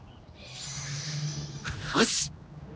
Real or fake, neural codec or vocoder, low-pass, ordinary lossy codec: fake; codec, 16 kHz, 2 kbps, X-Codec, HuBERT features, trained on general audio; none; none